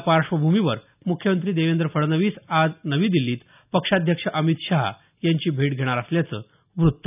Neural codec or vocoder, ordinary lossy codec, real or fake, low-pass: none; none; real; 3.6 kHz